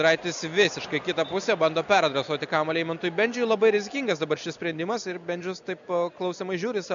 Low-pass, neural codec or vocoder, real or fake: 7.2 kHz; none; real